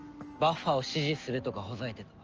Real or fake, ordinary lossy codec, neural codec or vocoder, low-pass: real; Opus, 24 kbps; none; 7.2 kHz